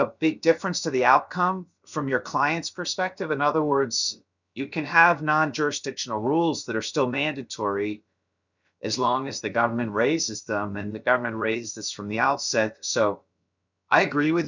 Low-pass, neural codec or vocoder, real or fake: 7.2 kHz; codec, 16 kHz, about 1 kbps, DyCAST, with the encoder's durations; fake